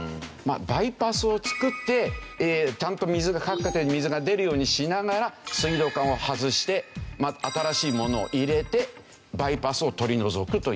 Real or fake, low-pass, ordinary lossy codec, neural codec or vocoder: real; none; none; none